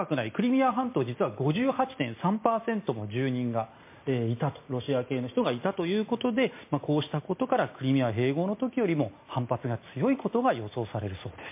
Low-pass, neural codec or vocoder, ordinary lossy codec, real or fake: 3.6 kHz; none; MP3, 24 kbps; real